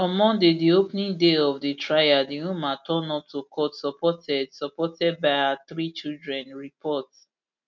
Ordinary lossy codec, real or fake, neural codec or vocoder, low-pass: MP3, 64 kbps; real; none; 7.2 kHz